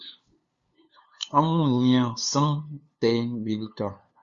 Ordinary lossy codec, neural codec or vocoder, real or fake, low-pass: Opus, 64 kbps; codec, 16 kHz, 2 kbps, FunCodec, trained on LibriTTS, 25 frames a second; fake; 7.2 kHz